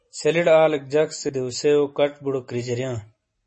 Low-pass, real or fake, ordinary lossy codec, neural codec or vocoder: 10.8 kHz; real; MP3, 32 kbps; none